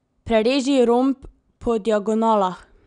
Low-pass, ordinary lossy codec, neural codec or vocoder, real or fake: 9.9 kHz; none; none; real